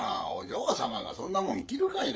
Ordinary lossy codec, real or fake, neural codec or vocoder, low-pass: none; fake; codec, 16 kHz, 8 kbps, FreqCodec, larger model; none